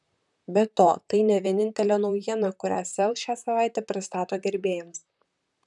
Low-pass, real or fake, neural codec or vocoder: 10.8 kHz; fake; vocoder, 44.1 kHz, 128 mel bands, Pupu-Vocoder